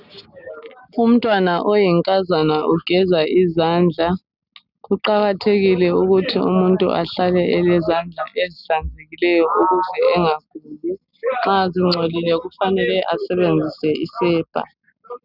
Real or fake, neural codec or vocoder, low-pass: real; none; 5.4 kHz